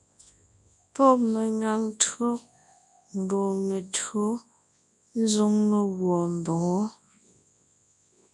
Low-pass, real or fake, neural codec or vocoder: 10.8 kHz; fake; codec, 24 kHz, 0.9 kbps, WavTokenizer, large speech release